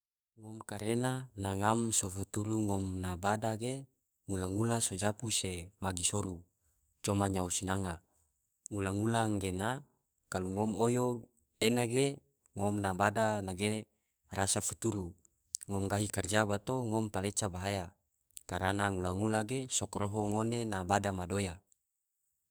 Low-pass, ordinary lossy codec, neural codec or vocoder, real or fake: none; none; codec, 44.1 kHz, 2.6 kbps, SNAC; fake